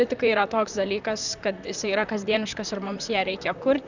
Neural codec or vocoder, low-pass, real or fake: vocoder, 44.1 kHz, 128 mel bands, Pupu-Vocoder; 7.2 kHz; fake